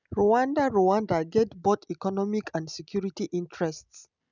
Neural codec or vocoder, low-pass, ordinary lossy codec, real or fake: none; 7.2 kHz; none; real